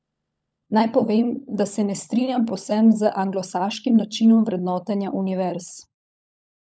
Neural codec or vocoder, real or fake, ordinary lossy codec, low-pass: codec, 16 kHz, 16 kbps, FunCodec, trained on LibriTTS, 50 frames a second; fake; none; none